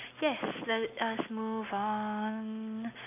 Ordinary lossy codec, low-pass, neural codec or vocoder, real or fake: none; 3.6 kHz; none; real